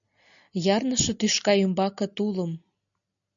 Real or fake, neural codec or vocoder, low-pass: real; none; 7.2 kHz